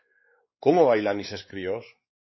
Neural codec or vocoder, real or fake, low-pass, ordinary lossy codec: codec, 16 kHz, 4 kbps, X-Codec, WavLM features, trained on Multilingual LibriSpeech; fake; 7.2 kHz; MP3, 24 kbps